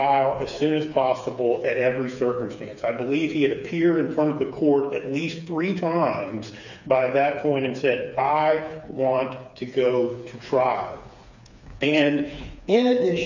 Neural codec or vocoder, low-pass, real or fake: codec, 16 kHz, 4 kbps, FreqCodec, smaller model; 7.2 kHz; fake